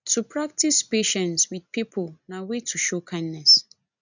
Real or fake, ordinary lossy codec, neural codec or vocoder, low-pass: real; none; none; 7.2 kHz